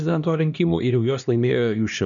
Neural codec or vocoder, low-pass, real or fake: codec, 16 kHz, 1 kbps, X-Codec, HuBERT features, trained on LibriSpeech; 7.2 kHz; fake